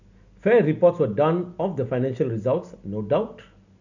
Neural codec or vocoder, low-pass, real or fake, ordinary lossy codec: none; 7.2 kHz; real; none